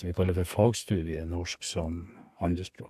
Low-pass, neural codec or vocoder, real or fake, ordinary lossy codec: 14.4 kHz; codec, 32 kHz, 1.9 kbps, SNAC; fake; AAC, 96 kbps